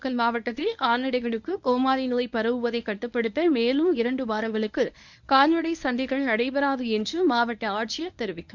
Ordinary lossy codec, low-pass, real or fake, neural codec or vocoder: none; 7.2 kHz; fake; codec, 24 kHz, 0.9 kbps, WavTokenizer, medium speech release version 1